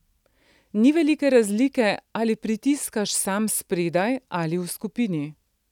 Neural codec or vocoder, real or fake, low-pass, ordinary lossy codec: none; real; 19.8 kHz; none